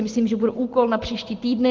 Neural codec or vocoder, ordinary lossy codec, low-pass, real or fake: none; Opus, 16 kbps; 7.2 kHz; real